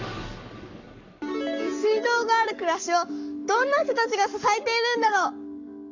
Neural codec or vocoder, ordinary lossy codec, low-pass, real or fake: codec, 44.1 kHz, 7.8 kbps, Pupu-Codec; none; 7.2 kHz; fake